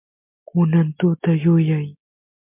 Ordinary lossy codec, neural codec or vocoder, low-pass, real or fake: MP3, 32 kbps; none; 3.6 kHz; real